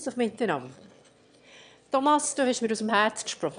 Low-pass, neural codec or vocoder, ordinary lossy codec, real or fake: 9.9 kHz; autoencoder, 22.05 kHz, a latent of 192 numbers a frame, VITS, trained on one speaker; none; fake